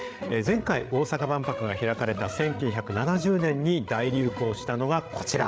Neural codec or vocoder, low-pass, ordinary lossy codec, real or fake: codec, 16 kHz, 8 kbps, FreqCodec, larger model; none; none; fake